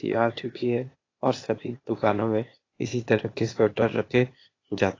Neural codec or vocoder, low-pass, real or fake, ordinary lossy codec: codec, 16 kHz, 0.8 kbps, ZipCodec; 7.2 kHz; fake; AAC, 32 kbps